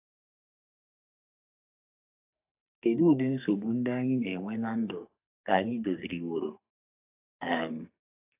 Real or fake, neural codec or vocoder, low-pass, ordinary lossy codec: fake; codec, 44.1 kHz, 2.6 kbps, SNAC; 3.6 kHz; AAC, 32 kbps